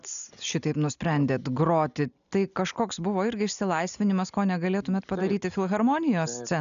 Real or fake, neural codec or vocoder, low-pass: real; none; 7.2 kHz